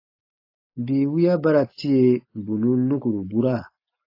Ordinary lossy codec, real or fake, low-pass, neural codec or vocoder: AAC, 32 kbps; real; 5.4 kHz; none